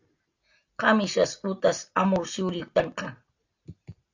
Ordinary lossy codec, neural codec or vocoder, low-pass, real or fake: AAC, 48 kbps; none; 7.2 kHz; real